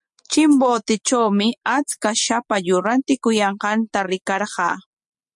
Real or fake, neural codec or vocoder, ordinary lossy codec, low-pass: real; none; MP3, 64 kbps; 10.8 kHz